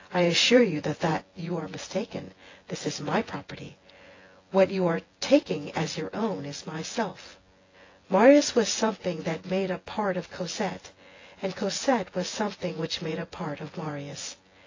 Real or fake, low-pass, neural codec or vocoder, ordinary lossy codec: fake; 7.2 kHz; vocoder, 24 kHz, 100 mel bands, Vocos; AAC, 32 kbps